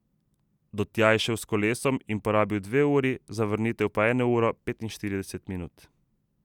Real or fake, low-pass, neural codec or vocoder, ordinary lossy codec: real; 19.8 kHz; none; none